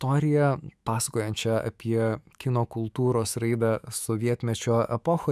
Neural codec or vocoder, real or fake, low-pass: autoencoder, 48 kHz, 128 numbers a frame, DAC-VAE, trained on Japanese speech; fake; 14.4 kHz